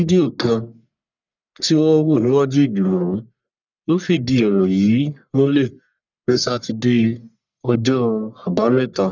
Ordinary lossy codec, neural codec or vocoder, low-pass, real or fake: none; codec, 44.1 kHz, 1.7 kbps, Pupu-Codec; 7.2 kHz; fake